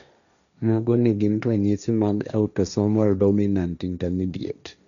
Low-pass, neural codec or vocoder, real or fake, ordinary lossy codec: 7.2 kHz; codec, 16 kHz, 1.1 kbps, Voila-Tokenizer; fake; none